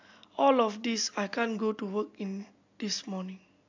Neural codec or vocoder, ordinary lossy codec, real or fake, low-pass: none; AAC, 48 kbps; real; 7.2 kHz